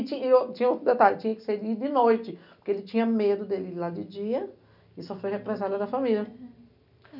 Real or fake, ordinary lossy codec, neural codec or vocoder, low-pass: real; none; none; 5.4 kHz